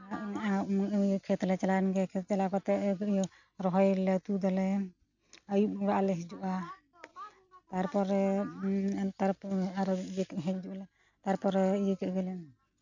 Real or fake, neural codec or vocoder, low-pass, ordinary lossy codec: real; none; 7.2 kHz; none